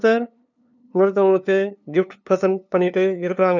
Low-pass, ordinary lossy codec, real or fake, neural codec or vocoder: 7.2 kHz; none; fake; codec, 16 kHz, 2 kbps, FunCodec, trained on LibriTTS, 25 frames a second